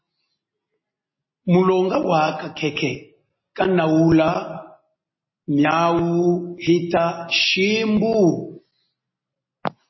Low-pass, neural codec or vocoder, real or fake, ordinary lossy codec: 7.2 kHz; none; real; MP3, 24 kbps